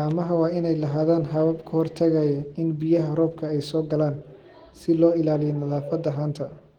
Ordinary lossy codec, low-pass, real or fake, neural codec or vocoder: Opus, 16 kbps; 19.8 kHz; real; none